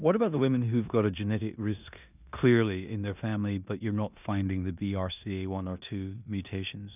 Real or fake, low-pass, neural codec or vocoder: fake; 3.6 kHz; codec, 16 kHz in and 24 kHz out, 0.9 kbps, LongCat-Audio-Codec, four codebook decoder